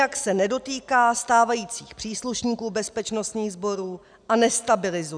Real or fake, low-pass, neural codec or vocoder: real; 9.9 kHz; none